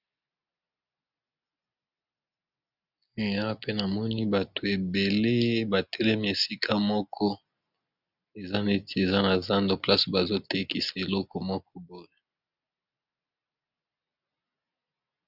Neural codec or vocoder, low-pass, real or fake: none; 5.4 kHz; real